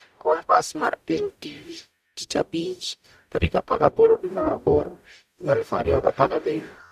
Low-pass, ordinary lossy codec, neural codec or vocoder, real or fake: 14.4 kHz; none; codec, 44.1 kHz, 0.9 kbps, DAC; fake